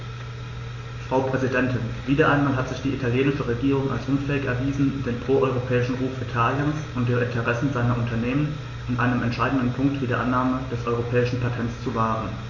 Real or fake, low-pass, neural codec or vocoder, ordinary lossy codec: real; 7.2 kHz; none; MP3, 32 kbps